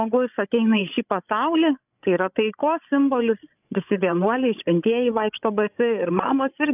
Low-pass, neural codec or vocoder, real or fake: 3.6 kHz; codec, 16 kHz, 16 kbps, FreqCodec, larger model; fake